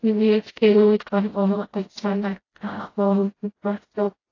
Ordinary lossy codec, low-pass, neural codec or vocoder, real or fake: AAC, 32 kbps; 7.2 kHz; codec, 16 kHz, 0.5 kbps, FreqCodec, smaller model; fake